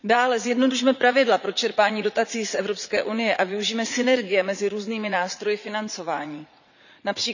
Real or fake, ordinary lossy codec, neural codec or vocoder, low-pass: fake; none; vocoder, 44.1 kHz, 80 mel bands, Vocos; 7.2 kHz